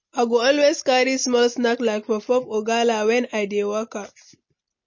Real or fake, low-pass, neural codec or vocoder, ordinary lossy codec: real; 7.2 kHz; none; MP3, 32 kbps